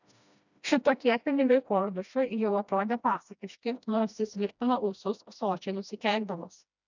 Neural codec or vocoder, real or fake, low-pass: codec, 16 kHz, 1 kbps, FreqCodec, smaller model; fake; 7.2 kHz